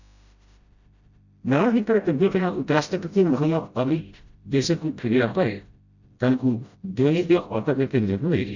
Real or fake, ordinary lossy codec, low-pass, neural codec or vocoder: fake; Opus, 64 kbps; 7.2 kHz; codec, 16 kHz, 0.5 kbps, FreqCodec, smaller model